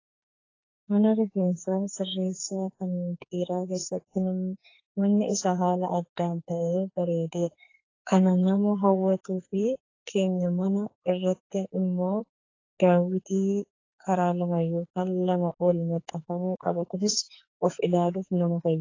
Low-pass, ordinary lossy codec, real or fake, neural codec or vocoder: 7.2 kHz; AAC, 32 kbps; fake; codec, 44.1 kHz, 2.6 kbps, SNAC